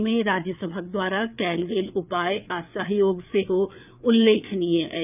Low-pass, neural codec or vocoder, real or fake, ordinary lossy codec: 3.6 kHz; codec, 16 kHz, 4 kbps, FreqCodec, larger model; fake; none